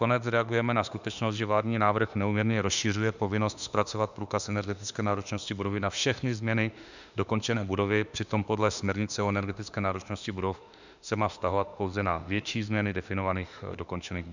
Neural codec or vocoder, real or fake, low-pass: autoencoder, 48 kHz, 32 numbers a frame, DAC-VAE, trained on Japanese speech; fake; 7.2 kHz